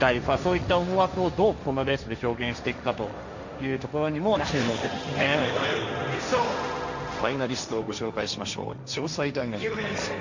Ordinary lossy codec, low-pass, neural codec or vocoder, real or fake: none; 7.2 kHz; codec, 16 kHz, 1.1 kbps, Voila-Tokenizer; fake